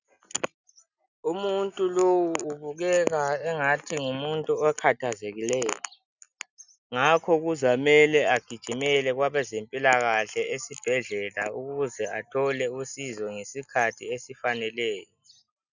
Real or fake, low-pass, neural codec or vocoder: real; 7.2 kHz; none